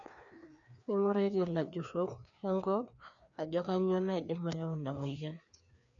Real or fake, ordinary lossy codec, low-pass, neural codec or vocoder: fake; none; 7.2 kHz; codec, 16 kHz, 2 kbps, FreqCodec, larger model